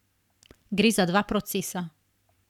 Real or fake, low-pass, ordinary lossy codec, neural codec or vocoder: fake; 19.8 kHz; none; codec, 44.1 kHz, 7.8 kbps, Pupu-Codec